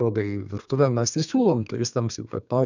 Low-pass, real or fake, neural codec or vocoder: 7.2 kHz; fake; codec, 32 kHz, 1.9 kbps, SNAC